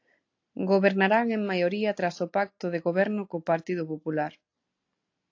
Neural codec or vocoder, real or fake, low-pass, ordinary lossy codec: none; real; 7.2 kHz; AAC, 48 kbps